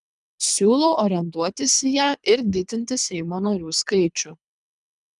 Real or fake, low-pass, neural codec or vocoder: fake; 10.8 kHz; codec, 24 kHz, 3 kbps, HILCodec